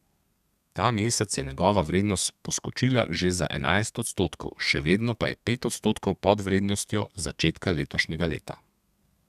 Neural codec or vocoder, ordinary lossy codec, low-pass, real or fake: codec, 32 kHz, 1.9 kbps, SNAC; none; 14.4 kHz; fake